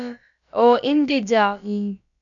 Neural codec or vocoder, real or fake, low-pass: codec, 16 kHz, about 1 kbps, DyCAST, with the encoder's durations; fake; 7.2 kHz